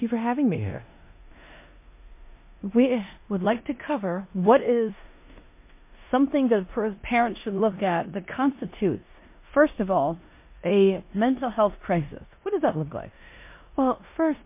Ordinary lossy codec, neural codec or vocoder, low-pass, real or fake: MP3, 24 kbps; codec, 16 kHz in and 24 kHz out, 0.9 kbps, LongCat-Audio-Codec, four codebook decoder; 3.6 kHz; fake